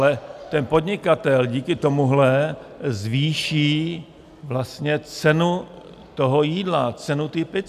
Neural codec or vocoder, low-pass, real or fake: none; 14.4 kHz; real